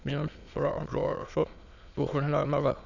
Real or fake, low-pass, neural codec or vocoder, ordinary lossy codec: fake; 7.2 kHz; autoencoder, 22.05 kHz, a latent of 192 numbers a frame, VITS, trained on many speakers; none